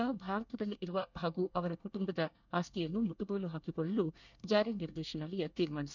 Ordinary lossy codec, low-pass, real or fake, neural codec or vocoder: none; 7.2 kHz; fake; codec, 24 kHz, 1 kbps, SNAC